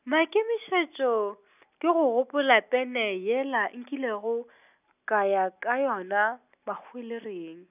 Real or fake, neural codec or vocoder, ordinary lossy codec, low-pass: real; none; none; 3.6 kHz